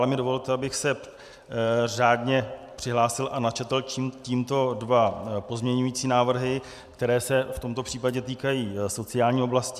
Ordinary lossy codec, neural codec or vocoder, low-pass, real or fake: MP3, 96 kbps; none; 14.4 kHz; real